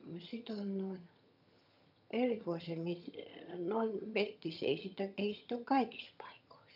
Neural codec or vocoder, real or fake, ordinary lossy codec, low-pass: vocoder, 22.05 kHz, 80 mel bands, HiFi-GAN; fake; none; 5.4 kHz